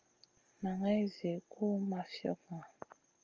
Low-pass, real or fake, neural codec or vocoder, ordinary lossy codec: 7.2 kHz; real; none; Opus, 24 kbps